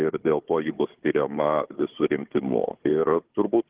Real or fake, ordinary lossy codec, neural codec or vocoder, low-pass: fake; Opus, 16 kbps; codec, 16 kHz, 4 kbps, FunCodec, trained on Chinese and English, 50 frames a second; 3.6 kHz